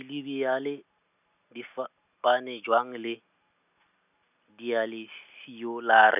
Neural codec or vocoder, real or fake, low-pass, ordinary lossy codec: none; real; 3.6 kHz; none